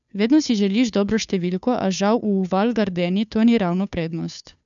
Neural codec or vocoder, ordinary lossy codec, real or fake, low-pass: codec, 16 kHz, 2 kbps, FunCodec, trained on Chinese and English, 25 frames a second; none; fake; 7.2 kHz